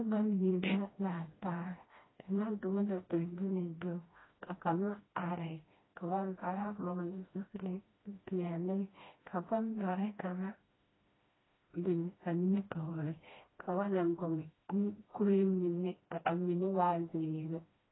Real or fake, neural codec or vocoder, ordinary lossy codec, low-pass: fake; codec, 16 kHz, 1 kbps, FreqCodec, smaller model; AAC, 16 kbps; 7.2 kHz